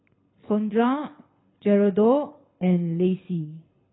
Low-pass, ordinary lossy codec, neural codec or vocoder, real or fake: 7.2 kHz; AAC, 16 kbps; codec, 24 kHz, 6 kbps, HILCodec; fake